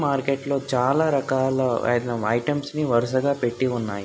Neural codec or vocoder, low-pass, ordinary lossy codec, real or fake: none; none; none; real